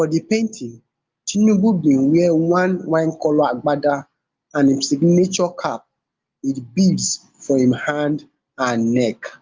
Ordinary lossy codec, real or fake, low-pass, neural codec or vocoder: Opus, 24 kbps; real; 7.2 kHz; none